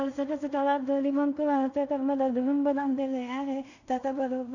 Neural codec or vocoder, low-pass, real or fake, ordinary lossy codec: codec, 16 kHz in and 24 kHz out, 0.4 kbps, LongCat-Audio-Codec, two codebook decoder; 7.2 kHz; fake; none